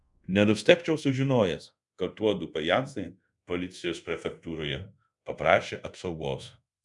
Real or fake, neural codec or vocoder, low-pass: fake; codec, 24 kHz, 0.5 kbps, DualCodec; 10.8 kHz